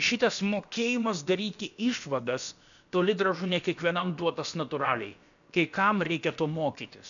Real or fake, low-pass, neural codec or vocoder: fake; 7.2 kHz; codec, 16 kHz, about 1 kbps, DyCAST, with the encoder's durations